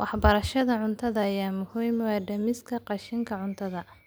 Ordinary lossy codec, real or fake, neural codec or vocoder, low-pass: none; real; none; none